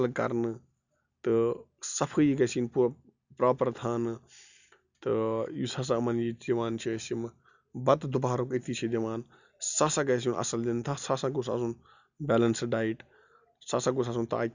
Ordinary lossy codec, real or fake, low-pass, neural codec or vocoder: none; real; 7.2 kHz; none